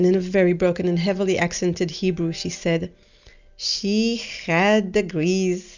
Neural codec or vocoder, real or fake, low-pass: none; real; 7.2 kHz